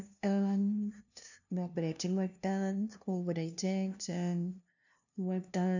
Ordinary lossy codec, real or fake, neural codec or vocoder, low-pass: none; fake; codec, 16 kHz, 0.5 kbps, FunCodec, trained on LibriTTS, 25 frames a second; 7.2 kHz